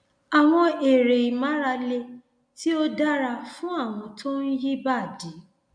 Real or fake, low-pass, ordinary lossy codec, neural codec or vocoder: real; 9.9 kHz; none; none